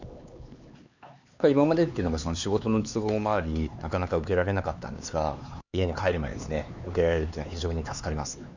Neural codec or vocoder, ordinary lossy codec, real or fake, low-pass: codec, 16 kHz, 4 kbps, X-Codec, HuBERT features, trained on LibriSpeech; none; fake; 7.2 kHz